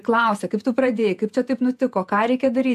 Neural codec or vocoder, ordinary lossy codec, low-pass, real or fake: vocoder, 48 kHz, 128 mel bands, Vocos; AAC, 96 kbps; 14.4 kHz; fake